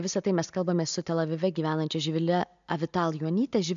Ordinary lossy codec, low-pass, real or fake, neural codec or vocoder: MP3, 64 kbps; 7.2 kHz; real; none